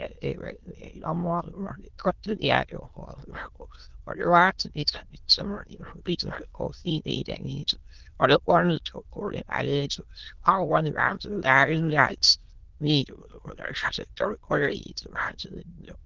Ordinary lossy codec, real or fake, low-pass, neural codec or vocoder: Opus, 16 kbps; fake; 7.2 kHz; autoencoder, 22.05 kHz, a latent of 192 numbers a frame, VITS, trained on many speakers